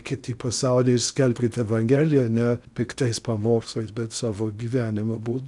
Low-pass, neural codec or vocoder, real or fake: 10.8 kHz; codec, 16 kHz in and 24 kHz out, 0.8 kbps, FocalCodec, streaming, 65536 codes; fake